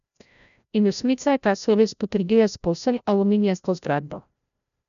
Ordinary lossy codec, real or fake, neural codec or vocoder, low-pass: none; fake; codec, 16 kHz, 0.5 kbps, FreqCodec, larger model; 7.2 kHz